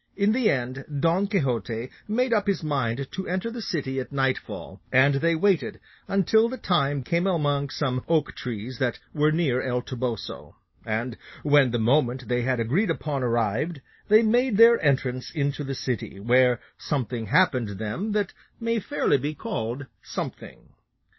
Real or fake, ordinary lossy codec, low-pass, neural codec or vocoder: real; MP3, 24 kbps; 7.2 kHz; none